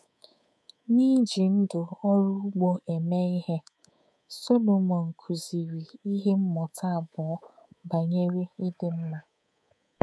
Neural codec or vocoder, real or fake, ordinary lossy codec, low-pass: codec, 24 kHz, 3.1 kbps, DualCodec; fake; none; none